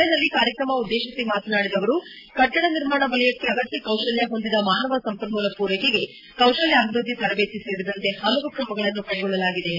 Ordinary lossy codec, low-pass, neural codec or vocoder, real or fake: AAC, 32 kbps; 5.4 kHz; none; real